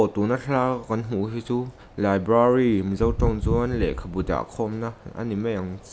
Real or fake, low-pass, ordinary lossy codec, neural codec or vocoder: real; none; none; none